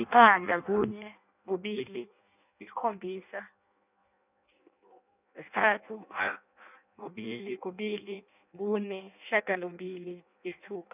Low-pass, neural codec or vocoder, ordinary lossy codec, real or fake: 3.6 kHz; codec, 16 kHz in and 24 kHz out, 0.6 kbps, FireRedTTS-2 codec; none; fake